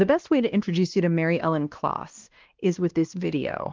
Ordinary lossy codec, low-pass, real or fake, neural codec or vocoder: Opus, 32 kbps; 7.2 kHz; fake; codec, 16 kHz, 1 kbps, X-Codec, WavLM features, trained on Multilingual LibriSpeech